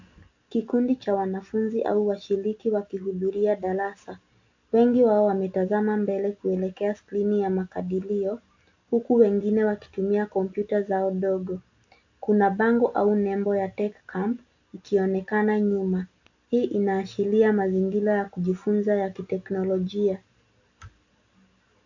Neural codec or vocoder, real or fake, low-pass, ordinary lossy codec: none; real; 7.2 kHz; AAC, 48 kbps